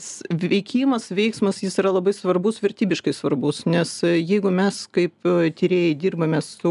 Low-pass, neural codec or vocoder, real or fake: 10.8 kHz; none; real